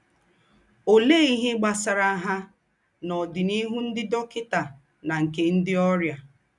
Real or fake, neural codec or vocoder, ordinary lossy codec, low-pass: real; none; none; 10.8 kHz